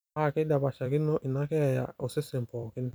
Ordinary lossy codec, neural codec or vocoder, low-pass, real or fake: none; vocoder, 44.1 kHz, 128 mel bands every 256 samples, BigVGAN v2; none; fake